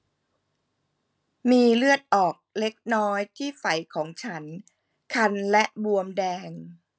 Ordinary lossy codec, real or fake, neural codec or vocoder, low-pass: none; real; none; none